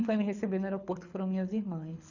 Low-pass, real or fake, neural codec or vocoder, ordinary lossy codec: 7.2 kHz; fake; codec, 24 kHz, 6 kbps, HILCodec; none